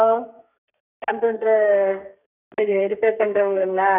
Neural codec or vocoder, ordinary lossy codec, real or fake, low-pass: codec, 32 kHz, 1.9 kbps, SNAC; none; fake; 3.6 kHz